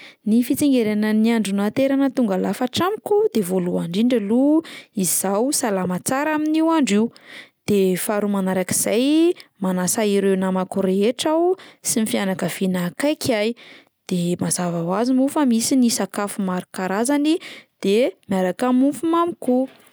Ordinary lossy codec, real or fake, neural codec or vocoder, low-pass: none; real; none; none